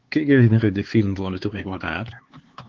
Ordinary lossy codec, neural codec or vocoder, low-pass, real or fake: Opus, 16 kbps; codec, 16 kHz, 4 kbps, X-Codec, HuBERT features, trained on LibriSpeech; 7.2 kHz; fake